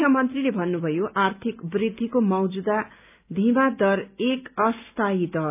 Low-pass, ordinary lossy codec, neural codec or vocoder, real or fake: 3.6 kHz; none; none; real